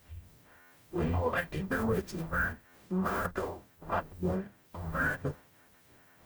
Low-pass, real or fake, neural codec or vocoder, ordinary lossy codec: none; fake; codec, 44.1 kHz, 0.9 kbps, DAC; none